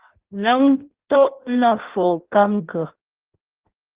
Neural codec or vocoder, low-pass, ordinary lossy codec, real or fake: codec, 16 kHz in and 24 kHz out, 0.6 kbps, FireRedTTS-2 codec; 3.6 kHz; Opus, 16 kbps; fake